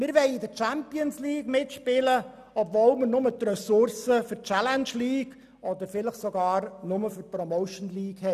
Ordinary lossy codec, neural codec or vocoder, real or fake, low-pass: none; none; real; 14.4 kHz